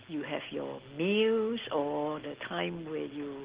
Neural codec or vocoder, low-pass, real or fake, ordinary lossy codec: none; 3.6 kHz; real; Opus, 16 kbps